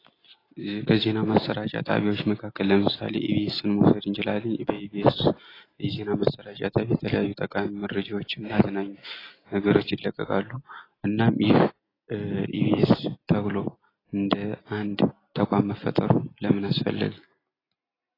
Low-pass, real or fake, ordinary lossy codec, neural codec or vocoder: 5.4 kHz; real; AAC, 24 kbps; none